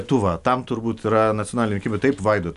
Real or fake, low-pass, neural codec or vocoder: real; 10.8 kHz; none